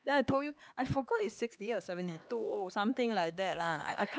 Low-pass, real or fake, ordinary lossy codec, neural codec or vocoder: none; fake; none; codec, 16 kHz, 2 kbps, X-Codec, HuBERT features, trained on LibriSpeech